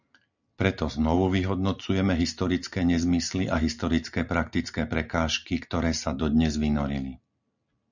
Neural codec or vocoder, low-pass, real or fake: none; 7.2 kHz; real